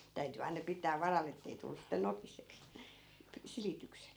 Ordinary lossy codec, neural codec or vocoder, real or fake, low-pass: none; vocoder, 44.1 kHz, 128 mel bands every 256 samples, BigVGAN v2; fake; none